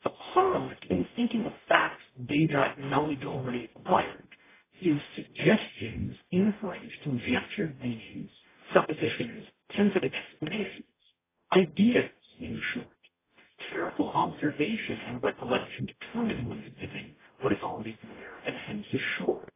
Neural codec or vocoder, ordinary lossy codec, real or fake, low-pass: codec, 44.1 kHz, 0.9 kbps, DAC; AAC, 16 kbps; fake; 3.6 kHz